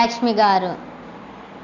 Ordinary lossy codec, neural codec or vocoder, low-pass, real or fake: none; none; 7.2 kHz; real